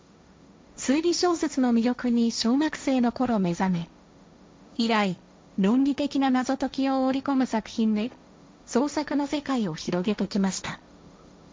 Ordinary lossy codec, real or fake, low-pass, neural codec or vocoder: none; fake; none; codec, 16 kHz, 1.1 kbps, Voila-Tokenizer